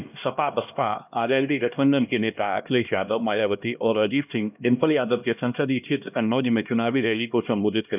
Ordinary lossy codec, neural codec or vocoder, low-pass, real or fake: none; codec, 16 kHz, 1 kbps, X-Codec, HuBERT features, trained on LibriSpeech; 3.6 kHz; fake